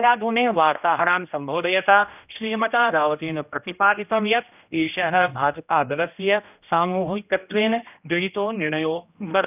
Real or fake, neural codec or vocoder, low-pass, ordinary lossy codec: fake; codec, 16 kHz, 1 kbps, X-Codec, HuBERT features, trained on general audio; 3.6 kHz; none